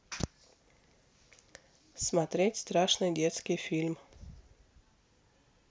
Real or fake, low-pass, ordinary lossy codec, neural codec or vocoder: real; none; none; none